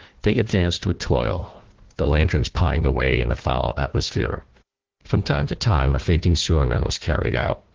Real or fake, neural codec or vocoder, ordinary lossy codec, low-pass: fake; codec, 16 kHz, 1 kbps, FunCodec, trained on Chinese and English, 50 frames a second; Opus, 16 kbps; 7.2 kHz